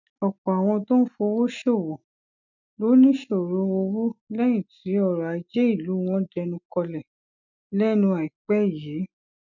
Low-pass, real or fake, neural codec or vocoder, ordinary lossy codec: 7.2 kHz; real; none; none